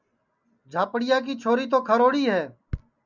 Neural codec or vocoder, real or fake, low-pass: none; real; 7.2 kHz